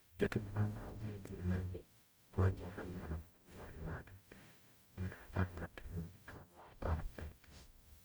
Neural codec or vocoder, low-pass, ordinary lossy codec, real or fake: codec, 44.1 kHz, 0.9 kbps, DAC; none; none; fake